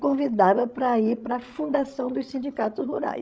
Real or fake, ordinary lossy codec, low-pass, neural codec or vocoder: fake; none; none; codec, 16 kHz, 16 kbps, FreqCodec, larger model